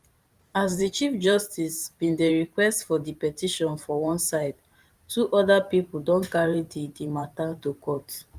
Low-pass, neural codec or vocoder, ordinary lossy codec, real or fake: 14.4 kHz; vocoder, 44.1 kHz, 128 mel bands every 512 samples, BigVGAN v2; Opus, 32 kbps; fake